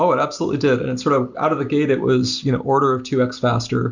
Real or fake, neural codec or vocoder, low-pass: real; none; 7.2 kHz